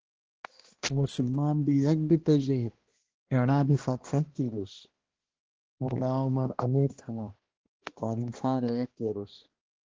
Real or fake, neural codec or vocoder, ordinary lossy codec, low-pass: fake; codec, 16 kHz, 1 kbps, X-Codec, HuBERT features, trained on balanced general audio; Opus, 16 kbps; 7.2 kHz